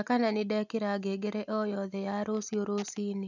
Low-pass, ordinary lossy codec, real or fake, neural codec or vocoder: 7.2 kHz; none; real; none